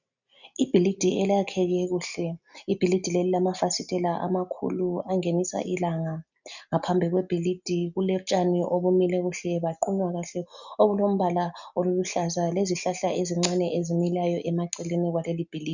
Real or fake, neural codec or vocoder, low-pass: real; none; 7.2 kHz